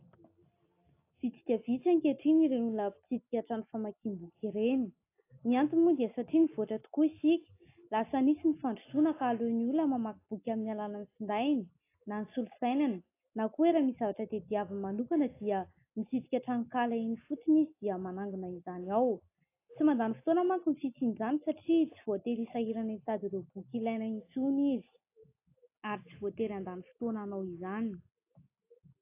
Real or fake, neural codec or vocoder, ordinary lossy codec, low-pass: real; none; AAC, 24 kbps; 3.6 kHz